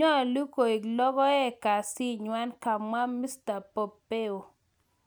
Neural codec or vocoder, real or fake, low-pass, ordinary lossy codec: none; real; none; none